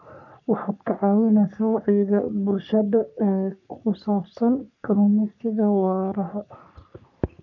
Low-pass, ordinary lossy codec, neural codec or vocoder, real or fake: 7.2 kHz; none; codec, 44.1 kHz, 3.4 kbps, Pupu-Codec; fake